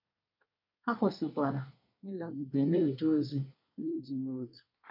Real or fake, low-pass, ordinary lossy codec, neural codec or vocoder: fake; 5.4 kHz; none; codec, 24 kHz, 1 kbps, SNAC